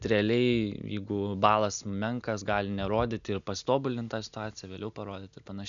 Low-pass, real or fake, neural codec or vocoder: 7.2 kHz; real; none